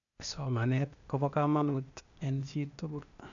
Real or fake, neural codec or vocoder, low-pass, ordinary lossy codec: fake; codec, 16 kHz, 0.8 kbps, ZipCodec; 7.2 kHz; none